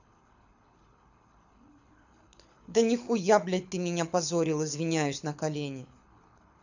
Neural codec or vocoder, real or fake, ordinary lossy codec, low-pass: codec, 24 kHz, 6 kbps, HILCodec; fake; none; 7.2 kHz